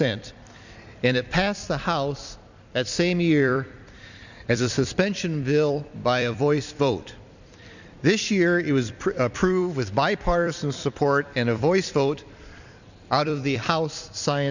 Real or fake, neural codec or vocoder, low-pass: fake; vocoder, 44.1 kHz, 128 mel bands every 256 samples, BigVGAN v2; 7.2 kHz